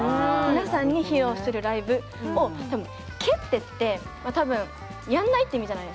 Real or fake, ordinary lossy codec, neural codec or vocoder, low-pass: real; none; none; none